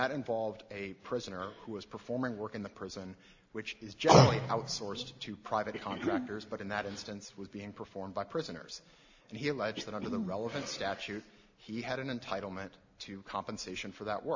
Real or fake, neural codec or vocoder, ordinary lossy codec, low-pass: real; none; AAC, 48 kbps; 7.2 kHz